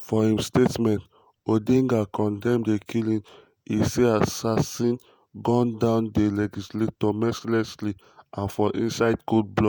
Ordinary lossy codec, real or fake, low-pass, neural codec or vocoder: none; fake; none; vocoder, 48 kHz, 128 mel bands, Vocos